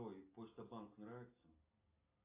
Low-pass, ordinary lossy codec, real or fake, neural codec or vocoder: 3.6 kHz; AAC, 32 kbps; real; none